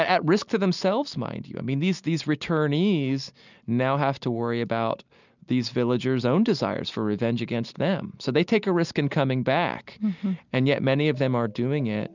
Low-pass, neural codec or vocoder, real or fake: 7.2 kHz; none; real